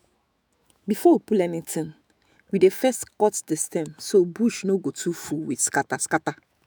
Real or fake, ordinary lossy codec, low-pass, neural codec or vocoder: fake; none; none; autoencoder, 48 kHz, 128 numbers a frame, DAC-VAE, trained on Japanese speech